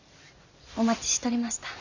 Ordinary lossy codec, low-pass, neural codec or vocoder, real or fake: none; 7.2 kHz; none; real